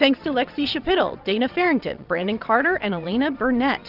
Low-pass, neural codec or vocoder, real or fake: 5.4 kHz; vocoder, 22.05 kHz, 80 mel bands, WaveNeXt; fake